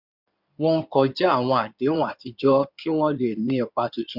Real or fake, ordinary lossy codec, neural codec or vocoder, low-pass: fake; Opus, 64 kbps; codec, 16 kHz in and 24 kHz out, 2.2 kbps, FireRedTTS-2 codec; 5.4 kHz